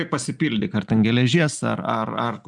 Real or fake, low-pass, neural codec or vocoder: real; 10.8 kHz; none